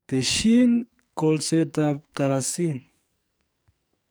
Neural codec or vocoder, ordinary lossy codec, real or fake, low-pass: codec, 44.1 kHz, 2.6 kbps, SNAC; none; fake; none